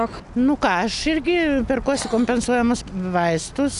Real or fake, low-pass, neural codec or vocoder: real; 10.8 kHz; none